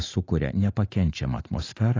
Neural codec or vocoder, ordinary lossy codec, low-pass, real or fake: none; AAC, 32 kbps; 7.2 kHz; real